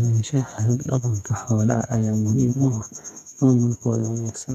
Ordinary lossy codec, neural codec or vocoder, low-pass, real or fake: none; codec, 32 kHz, 1.9 kbps, SNAC; 14.4 kHz; fake